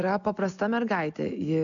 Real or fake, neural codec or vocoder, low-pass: real; none; 7.2 kHz